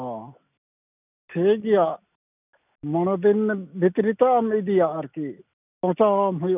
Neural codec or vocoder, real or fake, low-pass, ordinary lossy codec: none; real; 3.6 kHz; none